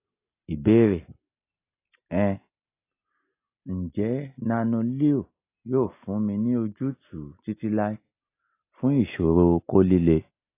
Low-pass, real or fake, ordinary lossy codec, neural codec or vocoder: 3.6 kHz; real; AAC, 24 kbps; none